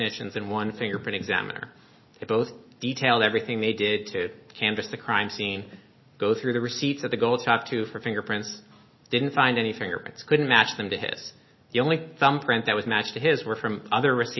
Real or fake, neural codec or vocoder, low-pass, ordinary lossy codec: real; none; 7.2 kHz; MP3, 24 kbps